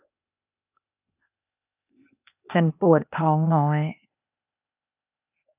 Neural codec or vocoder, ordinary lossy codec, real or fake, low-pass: codec, 16 kHz, 0.8 kbps, ZipCodec; none; fake; 3.6 kHz